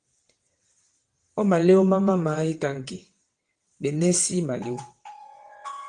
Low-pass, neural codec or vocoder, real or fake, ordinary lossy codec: 9.9 kHz; vocoder, 22.05 kHz, 80 mel bands, WaveNeXt; fake; Opus, 24 kbps